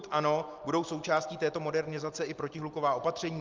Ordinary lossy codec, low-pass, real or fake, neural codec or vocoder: Opus, 32 kbps; 7.2 kHz; real; none